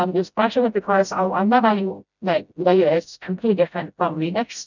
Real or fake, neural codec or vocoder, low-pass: fake; codec, 16 kHz, 0.5 kbps, FreqCodec, smaller model; 7.2 kHz